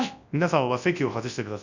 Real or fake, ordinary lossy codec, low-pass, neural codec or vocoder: fake; none; 7.2 kHz; codec, 24 kHz, 0.9 kbps, WavTokenizer, large speech release